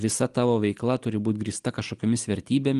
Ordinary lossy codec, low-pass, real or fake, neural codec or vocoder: Opus, 24 kbps; 10.8 kHz; real; none